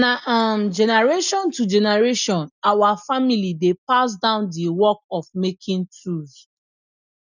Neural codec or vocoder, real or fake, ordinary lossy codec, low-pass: none; real; none; 7.2 kHz